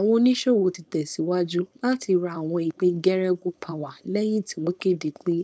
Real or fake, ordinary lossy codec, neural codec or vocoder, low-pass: fake; none; codec, 16 kHz, 4.8 kbps, FACodec; none